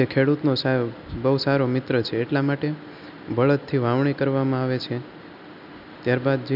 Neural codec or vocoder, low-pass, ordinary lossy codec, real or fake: none; 5.4 kHz; none; real